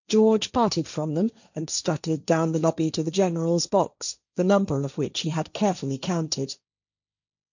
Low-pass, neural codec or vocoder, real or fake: 7.2 kHz; codec, 16 kHz, 1.1 kbps, Voila-Tokenizer; fake